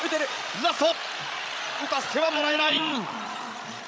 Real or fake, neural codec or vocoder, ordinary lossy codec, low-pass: fake; codec, 16 kHz, 16 kbps, FreqCodec, larger model; none; none